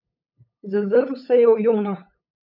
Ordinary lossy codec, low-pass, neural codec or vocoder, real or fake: none; 5.4 kHz; codec, 16 kHz, 8 kbps, FunCodec, trained on LibriTTS, 25 frames a second; fake